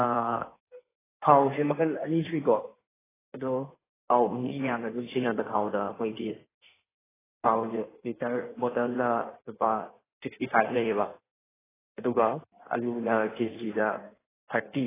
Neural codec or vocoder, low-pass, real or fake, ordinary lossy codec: codec, 16 kHz in and 24 kHz out, 1.1 kbps, FireRedTTS-2 codec; 3.6 kHz; fake; AAC, 16 kbps